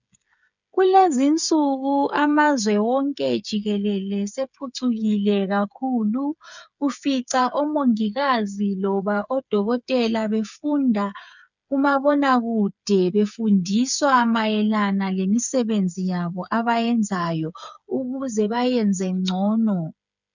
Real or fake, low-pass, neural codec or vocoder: fake; 7.2 kHz; codec, 16 kHz, 8 kbps, FreqCodec, smaller model